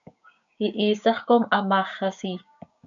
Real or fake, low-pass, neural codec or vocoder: fake; 7.2 kHz; codec, 16 kHz, 6 kbps, DAC